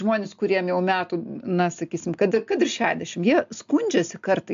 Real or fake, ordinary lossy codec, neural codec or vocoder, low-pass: real; AAC, 64 kbps; none; 7.2 kHz